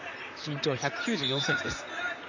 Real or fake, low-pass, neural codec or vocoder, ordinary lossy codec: fake; 7.2 kHz; vocoder, 44.1 kHz, 128 mel bands, Pupu-Vocoder; none